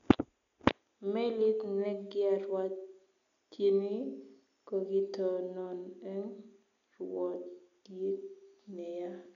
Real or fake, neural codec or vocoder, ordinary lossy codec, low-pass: real; none; none; 7.2 kHz